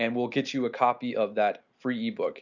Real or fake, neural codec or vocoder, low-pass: real; none; 7.2 kHz